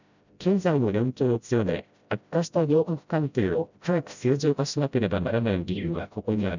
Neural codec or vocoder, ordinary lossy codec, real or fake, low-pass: codec, 16 kHz, 0.5 kbps, FreqCodec, smaller model; none; fake; 7.2 kHz